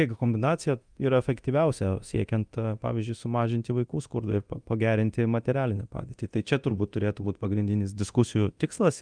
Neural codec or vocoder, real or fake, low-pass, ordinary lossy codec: codec, 24 kHz, 0.9 kbps, DualCodec; fake; 9.9 kHz; Opus, 32 kbps